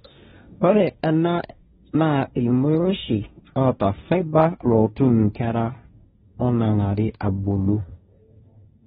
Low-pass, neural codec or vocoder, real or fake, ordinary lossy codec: 7.2 kHz; codec, 16 kHz, 1.1 kbps, Voila-Tokenizer; fake; AAC, 16 kbps